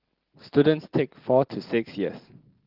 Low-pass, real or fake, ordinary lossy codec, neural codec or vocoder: 5.4 kHz; real; Opus, 16 kbps; none